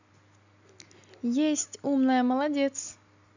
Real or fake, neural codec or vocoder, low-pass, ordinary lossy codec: real; none; 7.2 kHz; none